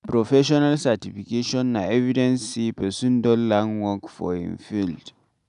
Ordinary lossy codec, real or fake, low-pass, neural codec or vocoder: none; real; 10.8 kHz; none